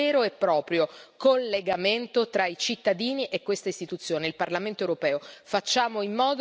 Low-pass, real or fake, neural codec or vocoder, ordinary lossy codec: none; real; none; none